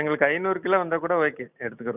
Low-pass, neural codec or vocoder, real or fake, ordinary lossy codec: 3.6 kHz; none; real; none